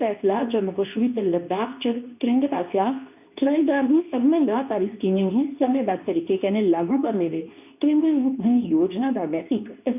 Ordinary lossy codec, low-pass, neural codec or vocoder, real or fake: none; 3.6 kHz; codec, 24 kHz, 0.9 kbps, WavTokenizer, medium speech release version 2; fake